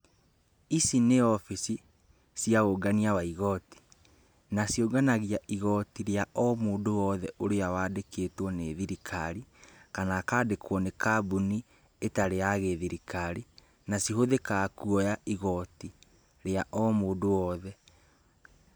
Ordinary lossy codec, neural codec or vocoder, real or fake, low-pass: none; none; real; none